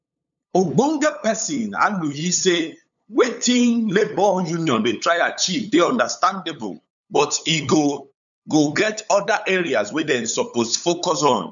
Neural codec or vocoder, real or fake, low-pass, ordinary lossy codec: codec, 16 kHz, 8 kbps, FunCodec, trained on LibriTTS, 25 frames a second; fake; 7.2 kHz; none